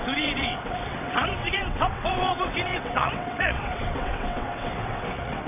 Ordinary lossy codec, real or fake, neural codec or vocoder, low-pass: MP3, 32 kbps; fake; vocoder, 22.05 kHz, 80 mel bands, WaveNeXt; 3.6 kHz